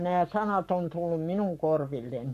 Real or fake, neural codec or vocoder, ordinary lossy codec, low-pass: fake; codec, 44.1 kHz, 7.8 kbps, Pupu-Codec; none; 14.4 kHz